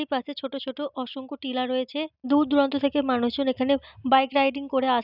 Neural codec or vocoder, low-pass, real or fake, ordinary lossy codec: none; 5.4 kHz; real; none